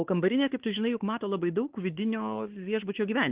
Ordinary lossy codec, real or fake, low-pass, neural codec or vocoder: Opus, 16 kbps; fake; 3.6 kHz; codec, 16 kHz, 4 kbps, X-Codec, WavLM features, trained on Multilingual LibriSpeech